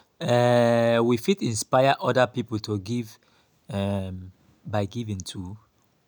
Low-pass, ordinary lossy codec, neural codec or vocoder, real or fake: none; none; none; real